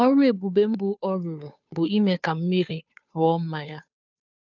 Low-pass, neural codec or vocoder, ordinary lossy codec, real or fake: 7.2 kHz; codec, 16 kHz, 2 kbps, FunCodec, trained on Chinese and English, 25 frames a second; none; fake